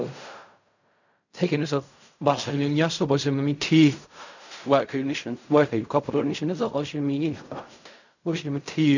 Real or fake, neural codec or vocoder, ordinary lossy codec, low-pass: fake; codec, 16 kHz in and 24 kHz out, 0.4 kbps, LongCat-Audio-Codec, fine tuned four codebook decoder; none; 7.2 kHz